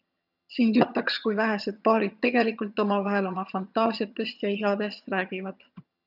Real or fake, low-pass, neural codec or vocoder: fake; 5.4 kHz; vocoder, 22.05 kHz, 80 mel bands, HiFi-GAN